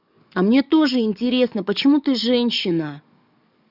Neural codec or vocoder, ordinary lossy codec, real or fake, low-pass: codec, 44.1 kHz, 7.8 kbps, DAC; none; fake; 5.4 kHz